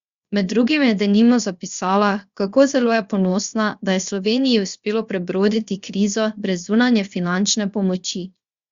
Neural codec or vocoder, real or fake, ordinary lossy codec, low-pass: codec, 16 kHz, 0.7 kbps, FocalCodec; fake; Opus, 64 kbps; 7.2 kHz